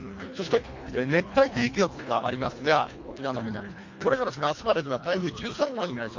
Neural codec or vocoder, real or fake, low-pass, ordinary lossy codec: codec, 24 kHz, 1.5 kbps, HILCodec; fake; 7.2 kHz; MP3, 48 kbps